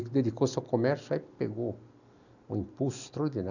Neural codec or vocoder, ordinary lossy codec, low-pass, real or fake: none; none; 7.2 kHz; real